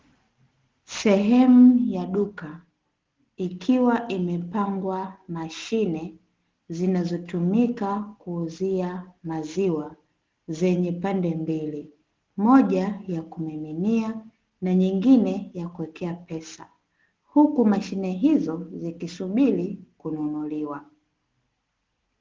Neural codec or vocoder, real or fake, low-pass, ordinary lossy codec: none; real; 7.2 kHz; Opus, 16 kbps